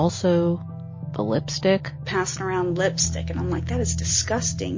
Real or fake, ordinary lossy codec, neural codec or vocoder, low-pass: real; MP3, 32 kbps; none; 7.2 kHz